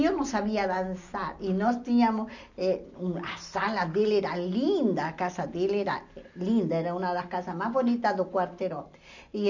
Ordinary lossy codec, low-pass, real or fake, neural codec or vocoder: none; 7.2 kHz; real; none